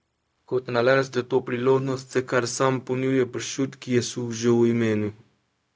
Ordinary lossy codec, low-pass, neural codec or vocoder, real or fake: none; none; codec, 16 kHz, 0.4 kbps, LongCat-Audio-Codec; fake